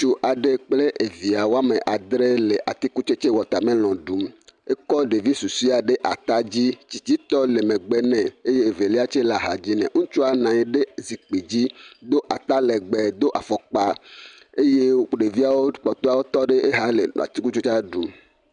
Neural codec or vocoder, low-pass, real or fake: none; 9.9 kHz; real